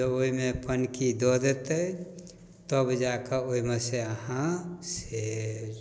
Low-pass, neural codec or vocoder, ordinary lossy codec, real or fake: none; none; none; real